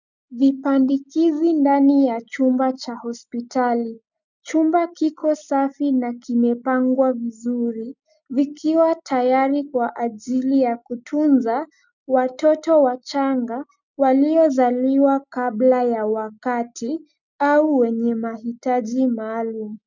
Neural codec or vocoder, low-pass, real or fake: none; 7.2 kHz; real